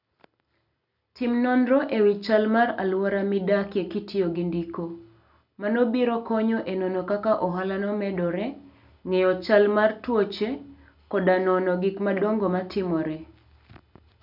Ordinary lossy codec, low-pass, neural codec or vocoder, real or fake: none; 5.4 kHz; none; real